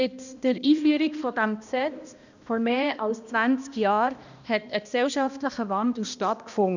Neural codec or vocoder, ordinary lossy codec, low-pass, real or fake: codec, 16 kHz, 1 kbps, X-Codec, HuBERT features, trained on balanced general audio; none; 7.2 kHz; fake